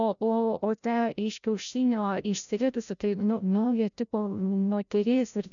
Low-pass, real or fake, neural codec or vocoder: 7.2 kHz; fake; codec, 16 kHz, 0.5 kbps, FreqCodec, larger model